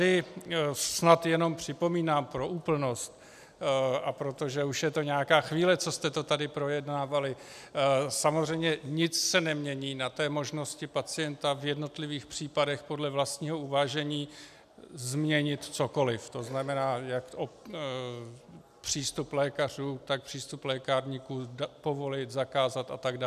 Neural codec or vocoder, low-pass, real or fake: none; 14.4 kHz; real